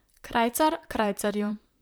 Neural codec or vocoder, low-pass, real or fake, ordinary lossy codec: vocoder, 44.1 kHz, 128 mel bands, Pupu-Vocoder; none; fake; none